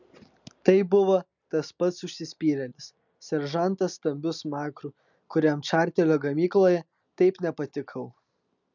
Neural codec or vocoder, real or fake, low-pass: none; real; 7.2 kHz